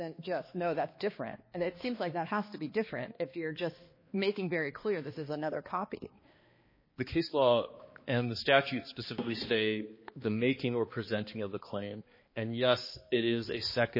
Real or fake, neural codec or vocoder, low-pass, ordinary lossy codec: fake; codec, 16 kHz, 2 kbps, X-Codec, HuBERT features, trained on balanced general audio; 5.4 kHz; MP3, 24 kbps